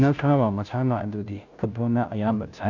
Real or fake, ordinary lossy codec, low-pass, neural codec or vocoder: fake; none; 7.2 kHz; codec, 16 kHz, 0.5 kbps, FunCodec, trained on Chinese and English, 25 frames a second